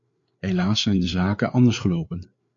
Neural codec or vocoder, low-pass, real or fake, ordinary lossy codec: codec, 16 kHz, 4 kbps, FreqCodec, larger model; 7.2 kHz; fake; MP3, 48 kbps